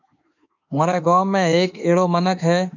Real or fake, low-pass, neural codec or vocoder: fake; 7.2 kHz; autoencoder, 48 kHz, 32 numbers a frame, DAC-VAE, trained on Japanese speech